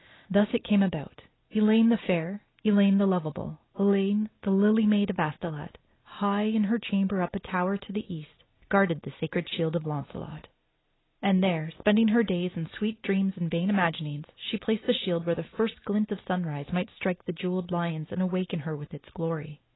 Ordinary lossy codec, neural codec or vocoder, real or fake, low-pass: AAC, 16 kbps; none; real; 7.2 kHz